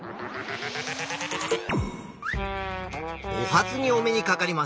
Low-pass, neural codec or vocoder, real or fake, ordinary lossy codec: none; none; real; none